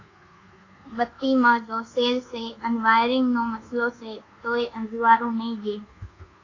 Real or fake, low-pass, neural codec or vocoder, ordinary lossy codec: fake; 7.2 kHz; codec, 24 kHz, 1.2 kbps, DualCodec; AAC, 32 kbps